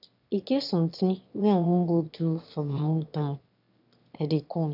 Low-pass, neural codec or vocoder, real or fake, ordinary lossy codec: 5.4 kHz; autoencoder, 22.05 kHz, a latent of 192 numbers a frame, VITS, trained on one speaker; fake; none